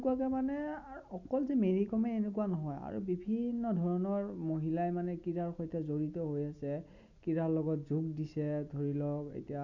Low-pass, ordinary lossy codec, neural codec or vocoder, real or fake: 7.2 kHz; none; none; real